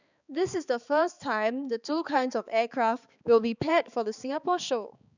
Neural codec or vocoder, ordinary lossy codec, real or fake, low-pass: codec, 16 kHz, 4 kbps, X-Codec, HuBERT features, trained on balanced general audio; none; fake; 7.2 kHz